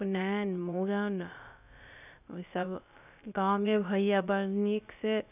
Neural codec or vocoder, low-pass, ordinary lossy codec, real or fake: codec, 16 kHz, about 1 kbps, DyCAST, with the encoder's durations; 3.6 kHz; none; fake